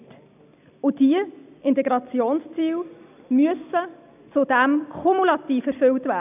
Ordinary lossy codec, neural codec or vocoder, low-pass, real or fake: none; none; 3.6 kHz; real